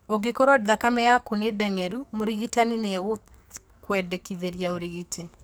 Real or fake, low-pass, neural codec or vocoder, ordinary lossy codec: fake; none; codec, 44.1 kHz, 2.6 kbps, SNAC; none